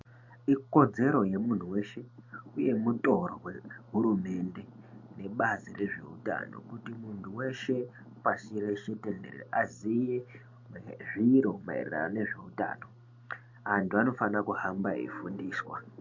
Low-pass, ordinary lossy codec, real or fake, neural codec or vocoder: 7.2 kHz; MP3, 48 kbps; real; none